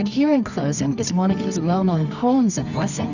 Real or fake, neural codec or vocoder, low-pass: fake; codec, 24 kHz, 0.9 kbps, WavTokenizer, medium music audio release; 7.2 kHz